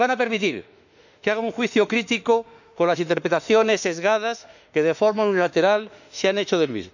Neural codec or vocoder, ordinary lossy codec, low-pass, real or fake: autoencoder, 48 kHz, 32 numbers a frame, DAC-VAE, trained on Japanese speech; none; 7.2 kHz; fake